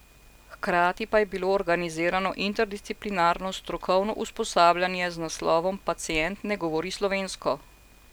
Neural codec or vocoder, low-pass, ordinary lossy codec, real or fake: none; none; none; real